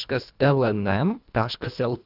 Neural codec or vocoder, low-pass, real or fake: codec, 24 kHz, 1.5 kbps, HILCodec; 5.4 kHz; fake